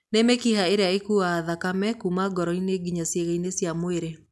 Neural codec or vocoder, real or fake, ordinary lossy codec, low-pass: none; real; none; none